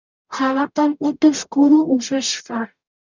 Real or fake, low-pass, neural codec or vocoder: fake; 7.2 kHz; codec, 44.1 kHz, 0.9 kbps, DAC